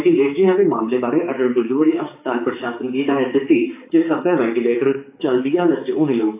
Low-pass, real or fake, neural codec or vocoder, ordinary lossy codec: 3.6 kHz; fake; codec, 16 kHz, 4 kbps, X-Codec, HuBERT features, trained on balanced general audio; AAC, 24 kbps